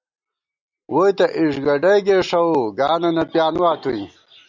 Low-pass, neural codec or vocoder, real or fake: 7.2 kHz; none; real